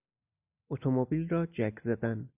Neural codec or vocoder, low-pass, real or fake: none; 3.6 kHz; real